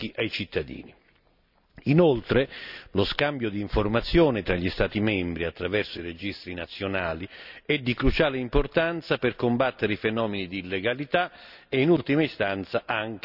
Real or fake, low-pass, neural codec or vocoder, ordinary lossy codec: real; 5.4 kHz; none; none